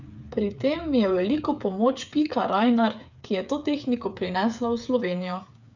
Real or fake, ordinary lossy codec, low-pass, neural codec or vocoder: fake; none; 7.2 kHz; codec, 16 kHz, 8 kbps, FreqCodec, smaller model